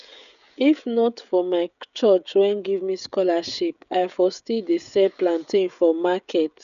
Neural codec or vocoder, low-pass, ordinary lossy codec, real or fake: codec, 16 kHz, 16 kbps, FreqCodec, smaller model; 7.2 kHz; none; fake